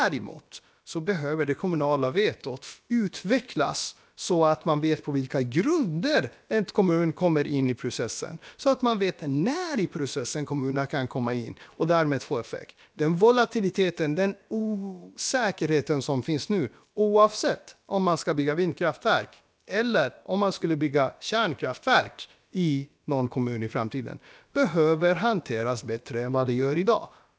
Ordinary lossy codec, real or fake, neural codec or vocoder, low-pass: none; fake; codec, 16 kHz, about 1 kbps, DyCAST, with the encoder's durations; none